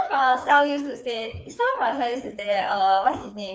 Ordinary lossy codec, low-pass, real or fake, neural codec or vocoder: none; none; fake; codec, 16 kHz, 2 kbps, FreqCodec, larger model